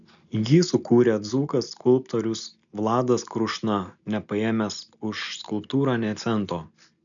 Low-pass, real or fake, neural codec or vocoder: 7.2 kHz; real; none